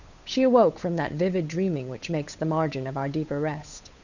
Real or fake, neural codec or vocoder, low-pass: fake; codec, 16 kHz, 8 kbps, FunCodec, trained on Chinese and English, 25 frames a second; 7.2 kHz